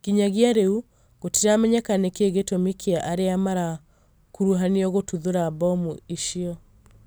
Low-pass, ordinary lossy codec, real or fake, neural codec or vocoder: none; none; real; none